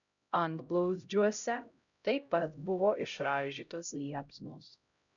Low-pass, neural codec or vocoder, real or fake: 7.2 kHz; codec, 16 kHz, 0.5 kbps, X-Codec, HuBERT features, trained on LibriSpeech; fake